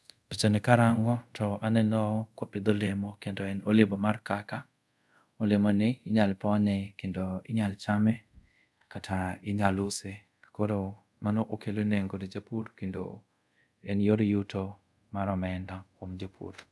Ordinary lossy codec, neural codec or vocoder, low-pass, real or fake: none; codec, 24 kHz, 0.5 kbps, DualCodec; none; fake